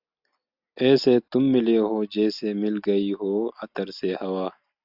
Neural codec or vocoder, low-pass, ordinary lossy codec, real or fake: none; 5.4 kHz; AAC, 48 kbps; real